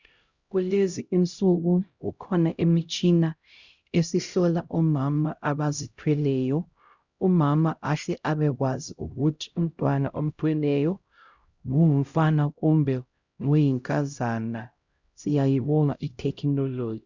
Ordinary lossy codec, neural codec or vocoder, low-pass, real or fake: Opus, 64 kbps; codec, 16 kHz, 0.5 kbps, X-Codec, HuBERT features, trained on LibriSpeech; 7.2 kHz; fake